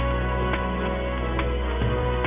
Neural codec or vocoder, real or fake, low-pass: none; real; 3.6 kHz